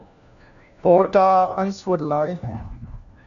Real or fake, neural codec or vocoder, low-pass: fake; codec, 16 kHz, 1 kbps, FunCodec, trained on LibriTTS, 50 frames a second; 7.2 kHz